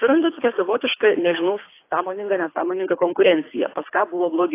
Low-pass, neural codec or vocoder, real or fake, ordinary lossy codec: 3.6 kHz; codec, 24 kHz, 3 kbps, HILCodec; fake; AAC, 24 kbps